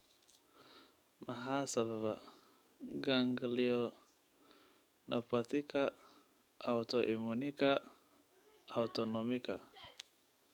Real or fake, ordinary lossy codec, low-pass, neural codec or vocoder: fake; none; 19.8 kHz; codec, 44.1 kHz, 7.8 kbps, DAC